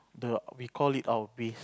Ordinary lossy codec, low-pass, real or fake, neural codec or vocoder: none; none; real; none